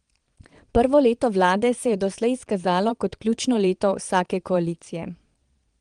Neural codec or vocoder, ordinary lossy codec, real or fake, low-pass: vocoder, 22.05 kHz, 80 mel bands, Vocos; Opus, 24 kbps; fake; 9.9 kHz